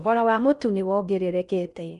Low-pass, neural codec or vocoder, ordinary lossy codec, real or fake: 10.8 kHz; codec, 16 kHz in and 24 kHz out, 0.6 kbps, FocalCodec, streaming, 4096 codes; none; fake